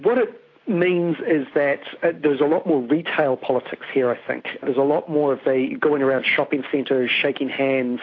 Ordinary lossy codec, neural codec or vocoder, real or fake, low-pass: AAC, 32 kbps; none; real; 7.2 kHz